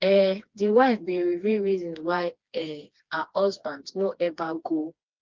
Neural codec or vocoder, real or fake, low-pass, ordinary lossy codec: codec, 16 kHz, 2 kbps, FreqCodec, smaller model; fake; 7.2 kHz; Opus, 24 kbps